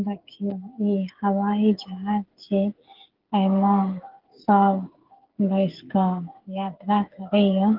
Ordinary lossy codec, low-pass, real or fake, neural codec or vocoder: Opus, 16 kbps; 5.4 kHz; fake; codec, 16 kHz, 8 kbps, FreqCodec, smaller model